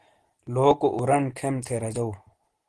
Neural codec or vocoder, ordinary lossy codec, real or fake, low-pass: none; Opus, 16 kbps; real; 10.8 kHz